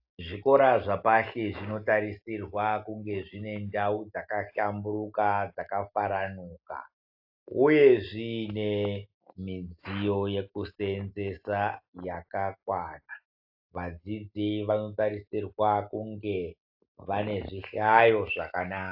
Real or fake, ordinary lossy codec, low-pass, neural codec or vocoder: real; AAC, 48 kbps; 5.4 kHz; none